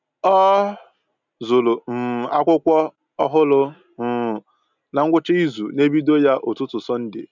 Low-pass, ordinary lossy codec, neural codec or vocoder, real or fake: 7.2 kHz; none; none; real